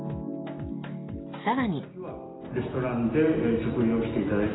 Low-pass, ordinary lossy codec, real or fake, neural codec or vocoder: 7.2 kHz; AAC, 16 kbps; real; none